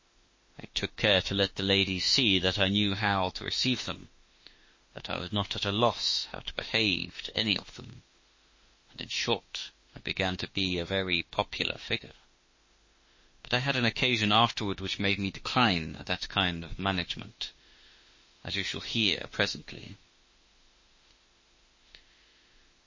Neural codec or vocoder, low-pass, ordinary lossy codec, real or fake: autoencoder, 48 kHz, 32 numbers a frame, DAC-VAE, trained on Japanese speech; 7.2 kHz; MP3, 32 kbps; fake